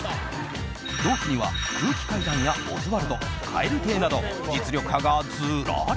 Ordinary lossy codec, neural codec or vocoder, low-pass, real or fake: none; none; none; real